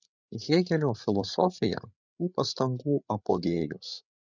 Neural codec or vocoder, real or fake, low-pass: codec, 16 kHz, 8 kbps, FreqCodec, larger model; fake; 7.2 kHz